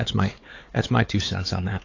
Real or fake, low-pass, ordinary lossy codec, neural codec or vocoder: fake; 7.2 kHz; AAC, 32 kbps; codec, 16 kHz, 4 kbps, X-Codec, HuBERT features, trained on LibriSpeech